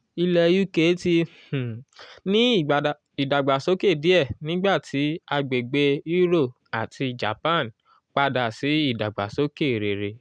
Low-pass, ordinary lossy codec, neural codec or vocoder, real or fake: 9.9 kHz; none; none; real